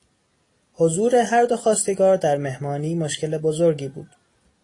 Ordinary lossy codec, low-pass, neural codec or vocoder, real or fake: AAC, 32 kbps; 10.8 kHz; none; real